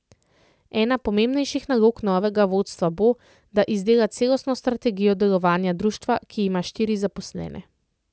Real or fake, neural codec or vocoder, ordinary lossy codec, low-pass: real; none; none; none